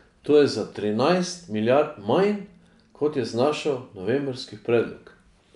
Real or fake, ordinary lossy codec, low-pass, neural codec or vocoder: real; none; 10.8 kHz; none